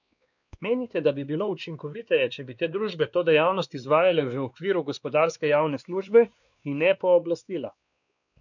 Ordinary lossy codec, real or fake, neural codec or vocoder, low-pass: none; fake; codec, 16 kHz, 2 kbps, X-Codec, WavLM features, trained on Multilingual LibriSpeech; 7.2 kHz